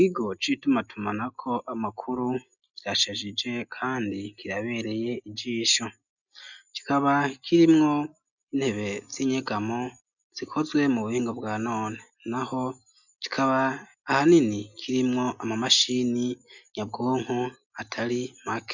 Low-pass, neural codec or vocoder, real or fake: 7.2 kHz; none; real